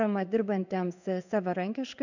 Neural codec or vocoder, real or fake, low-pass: codec, 16 kHz in and 24 kHz out, 1 kbps, XY-Tokenizer; fake; 7.2 kHz